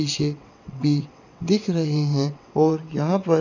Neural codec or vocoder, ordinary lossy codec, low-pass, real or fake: vocoder, 22.05 kHz, 80 mel bands, WaveNeXt; none; 7.2 kHz; fake